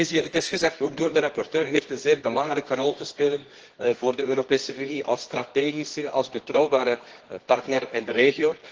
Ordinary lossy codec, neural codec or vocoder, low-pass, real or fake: Opus, 16 kbps; codec, 24 kHz, 0.9 kbps, WavTokenizer, medium music audio release; 7.2 kHz; fake